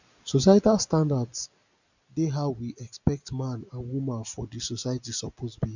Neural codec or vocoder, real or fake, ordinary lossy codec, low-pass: none; real; none; 7.2 kHz